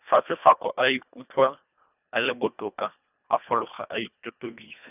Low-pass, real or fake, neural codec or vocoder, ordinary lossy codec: 3.6 kHz; fake; codec, 24 kHz, 1.5 kbps, HILCodec; none